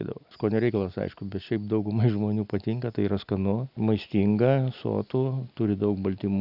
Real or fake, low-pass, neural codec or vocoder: real; 5.4 kHz; none